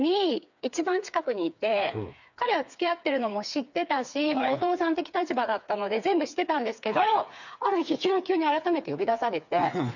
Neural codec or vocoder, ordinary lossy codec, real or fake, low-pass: codec, 16 kHz, 4 kbps, FreqCodec, smaller model; none; fake; 7.2 kHz